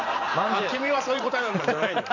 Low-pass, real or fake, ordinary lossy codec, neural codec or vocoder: 7.2 kHz; fake; none; vocoder, 44.1 kHz, 128 mel bands every 512 samples, BigVGAN v2